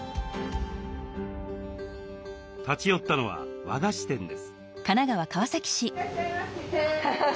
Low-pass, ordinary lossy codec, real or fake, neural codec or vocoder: none; none; real; none